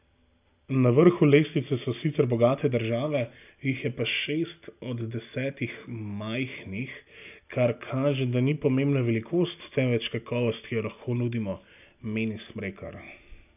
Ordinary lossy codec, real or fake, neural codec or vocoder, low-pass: none; real; none; 3.6 kHz